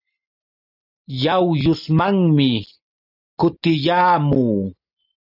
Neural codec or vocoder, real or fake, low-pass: none; real; 5.4 kHz